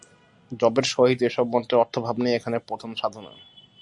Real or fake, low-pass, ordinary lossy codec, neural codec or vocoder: real; 10.8 kHz; Opus, 64 kbps; none